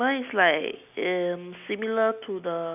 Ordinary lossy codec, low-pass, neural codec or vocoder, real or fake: none; 3.6 kHz; none; real